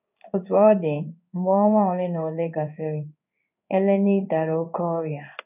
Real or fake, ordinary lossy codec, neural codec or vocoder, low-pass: fake; none; codec, 16 kHz in and 24 kHz out, 1 kbps, XY-Tokenizer; 3.6 kHz